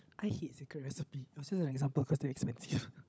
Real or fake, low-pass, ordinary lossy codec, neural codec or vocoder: fake; none; none; codec, 16 kHz, 16 kbps, FunCodec, trained on LibriTTS, 50 frames a second